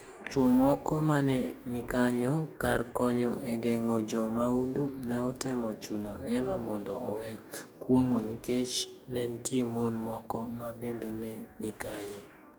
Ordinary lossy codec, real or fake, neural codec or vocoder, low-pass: none; fake; codec, 44.1 kHz, 2.6 kbps, DAC; none